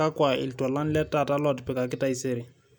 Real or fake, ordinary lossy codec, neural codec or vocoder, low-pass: real; none; none; none